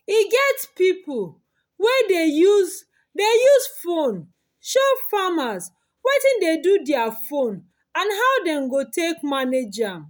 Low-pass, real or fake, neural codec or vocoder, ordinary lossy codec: none; real; none; none